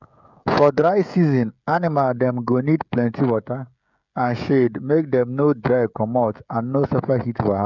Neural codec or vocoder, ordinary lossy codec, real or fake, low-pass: codec, 16 kHz, 16 kbps, FreqCodec, smaller model; none; fake; 7.2 kHz